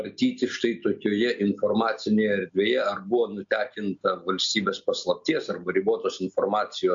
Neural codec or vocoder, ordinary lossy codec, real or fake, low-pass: none; MP3, 48 kbps; real; 7.2 kHz